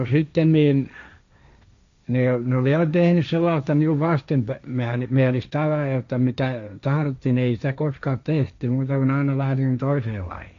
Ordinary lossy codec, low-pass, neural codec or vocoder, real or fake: MP3, 64 kbps; 7.2 kHz; codec, 16 kHz, 1.1 kbps, Voila-Tokenizer; fake